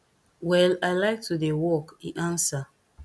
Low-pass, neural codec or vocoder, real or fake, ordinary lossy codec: none; none; real; none